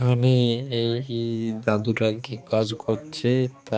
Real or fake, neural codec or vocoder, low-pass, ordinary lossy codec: fake; codec, 16 kHz, 2 kbps, X-Codec, HuBERT features, trained on balanced general audio; none; none